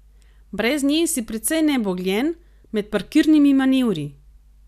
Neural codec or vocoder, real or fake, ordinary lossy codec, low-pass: none; real; none; 14.4 kHz